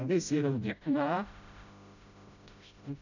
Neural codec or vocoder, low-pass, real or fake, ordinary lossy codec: codec, 16 kHz, 0.5 kbps, FreqCodec, smaller model; 7.2 kHz; fake; none